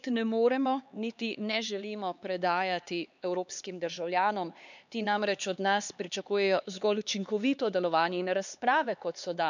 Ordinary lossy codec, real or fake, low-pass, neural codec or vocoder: none; fake; 7.2 kHz; codec, 16 kHz, 4 kbps, X-Codec, HuBERT features, trained on LibriSpeech